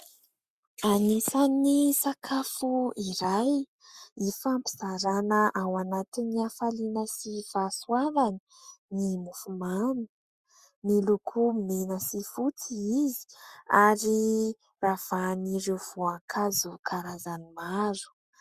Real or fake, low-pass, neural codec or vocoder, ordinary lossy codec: fake; 14.4 kHz; codec, 44.1 kHz, 7.8 kbps, Pupu-Codec; Opus, 64 kbps